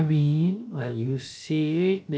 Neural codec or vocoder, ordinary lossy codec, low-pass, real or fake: codec, 16 kHz, about 1 kbps, DyCAST, with the encoder's durations; none; none; fake